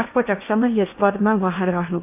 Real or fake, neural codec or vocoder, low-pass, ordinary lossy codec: fake; codec, 16 kHz in and 24 kHz out, 0.8 kbps, FocalCodec, streaming, 65536 codes; 3.6 kHz; AAC, 24 kbps